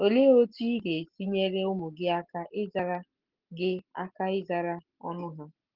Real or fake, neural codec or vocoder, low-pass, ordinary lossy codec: real; none; 5.4 kHz; Opus, 16 kbps